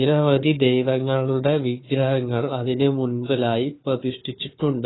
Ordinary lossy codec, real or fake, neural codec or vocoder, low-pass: AAC, 16 kbps; fake; codec, 16 kHz, 4 kbps, FunCodec, trained on Chinese and English, 50 frames a second; 7.2 kHz